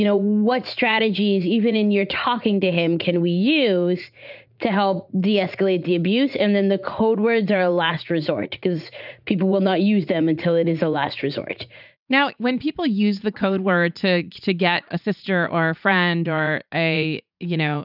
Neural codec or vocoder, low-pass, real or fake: vocoder, 44.1 kHz, 80 mel bands, Vocos; 5.4 kHz; fake